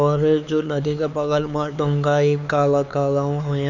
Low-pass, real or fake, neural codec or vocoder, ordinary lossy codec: 7.2 kHz; fake; codec, 16 kHz, 4 kbps, X-Codec, HuBERT features, trained on LibriSpeech; none